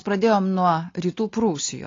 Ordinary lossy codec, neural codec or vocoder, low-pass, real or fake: AAC, 32 kbps; none; 7.2 kHz; real